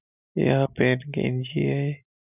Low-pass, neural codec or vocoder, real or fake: 3.6 kHz; none; real